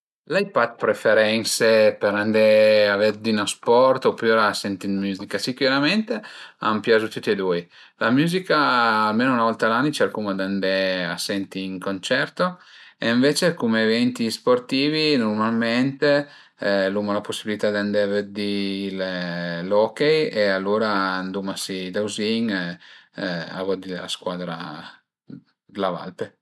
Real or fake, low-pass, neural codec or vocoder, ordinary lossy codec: real; none; none; none